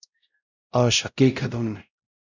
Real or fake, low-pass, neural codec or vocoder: fake; 7.2 kHz; codec, 16 kHz, 0.5 kbps, X-Codec, WavLM features, trained on Multilingual LibriSpeech